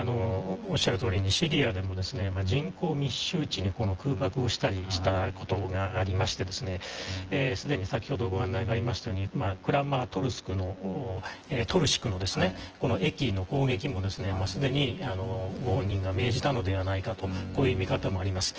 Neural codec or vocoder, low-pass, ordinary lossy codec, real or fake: vocoder, 24 kHz, 100 mel bands, Vocos; 7.2 kHz; Opus, 16 kbps; fake